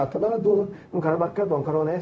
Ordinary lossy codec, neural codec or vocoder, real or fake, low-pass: none; codec, 16 kHz, 0.4 kbps, LongCat-Audio-Codec; fake; none